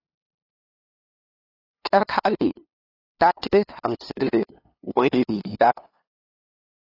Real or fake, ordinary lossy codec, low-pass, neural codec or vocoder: fake; AAC, 24 kbps; 5.4 kHz; codec, 16 kHz, 2 kbps, FunCodec, trained on LibriTTS, 25 frames a second